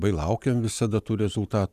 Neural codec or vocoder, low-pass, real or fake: vocoder, 44.1 kHz, 128 mel bands every 512 samples, BigVGAN v2; 14.4 kHz; fake